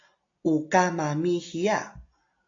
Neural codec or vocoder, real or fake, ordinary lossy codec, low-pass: none; real; AAC, 48 kbps; 7.2 kHz